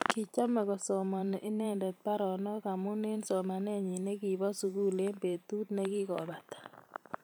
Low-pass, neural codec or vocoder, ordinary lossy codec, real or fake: none; vocoder, 44.1 kHz, 128 mel bands every 512 samples, BigVGAN v2; none; fake